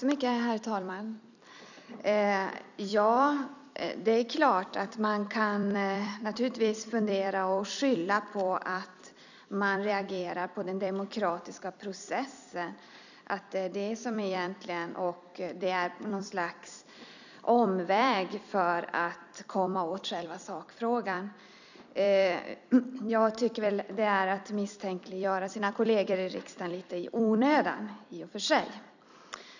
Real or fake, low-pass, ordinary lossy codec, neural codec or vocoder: fake; 7.2 kHz; none; vocoder, 44.1 kHz, 128 mel bands every 256 samples, BigVGAN v2